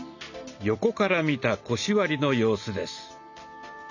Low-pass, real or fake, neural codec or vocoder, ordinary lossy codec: 7.2 kHz; real; none; none